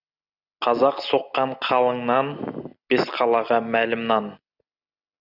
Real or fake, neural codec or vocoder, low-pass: real; none; 5.4 kHz